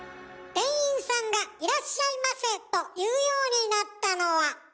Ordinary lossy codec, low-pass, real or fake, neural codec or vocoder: none; none; real; none